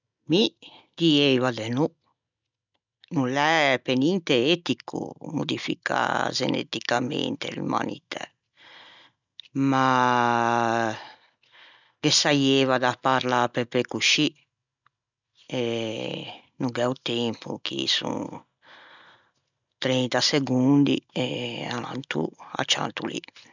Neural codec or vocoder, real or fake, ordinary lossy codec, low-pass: none; real; none; 7.2 kHz